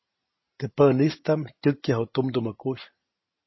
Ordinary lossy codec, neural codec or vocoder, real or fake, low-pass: MP3, 24 kbps; none; real; 7.2 kHz